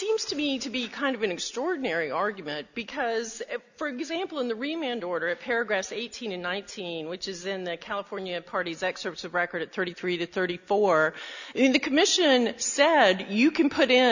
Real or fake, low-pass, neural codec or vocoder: real; 7.2 kHz; none